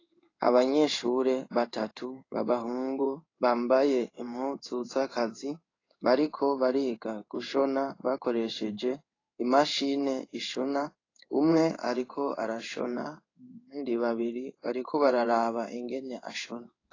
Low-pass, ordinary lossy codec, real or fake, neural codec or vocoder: 7.2 kHz; AAC, 32 kbps; fake; codec, 16 kHz in and 24 kHz out, 1 kbps, XY-Tokenizer